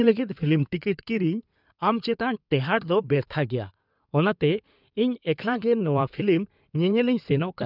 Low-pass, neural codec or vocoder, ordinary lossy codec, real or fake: 5.4 kHz; codec, 16 kHz in and 24 kHz out, 2.2 kbps, FireRedTTS-2 codec; none; fake